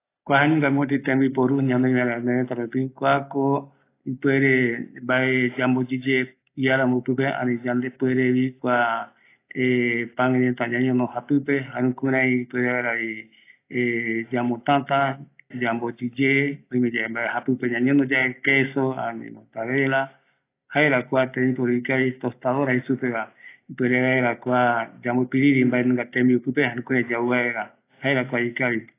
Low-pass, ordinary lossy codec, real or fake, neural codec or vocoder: 3.6 kHz; AAC, 24 kbps; real; none